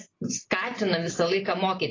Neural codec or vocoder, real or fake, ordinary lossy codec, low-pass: none; real; AAC, 32 kbps; 7.2 kHz